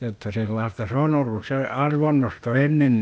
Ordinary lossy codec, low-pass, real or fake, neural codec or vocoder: none; none; fake; codec, 16 kHz, 0.8 kbps, ZipCodec